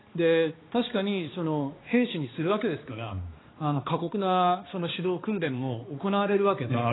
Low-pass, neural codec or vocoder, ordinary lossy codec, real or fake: 7.2 kHz; codec, 16 kHz, 2 kbps, X-Codec, HuBERT features, trained on balanced general audio; AAC, 16 kbps; fake